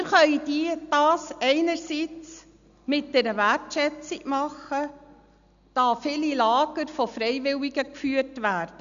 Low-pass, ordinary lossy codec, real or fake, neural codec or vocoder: 7.2 kHz; none; real; none